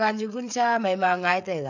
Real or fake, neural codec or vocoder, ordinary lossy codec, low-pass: fake; codec, 16 kHz, 16 kbps, FreqCodec, smaller model; none; 7.2 kHz